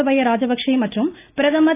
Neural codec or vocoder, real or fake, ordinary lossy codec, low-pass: none; real; AAC, 16 kbps; 3.6 kHz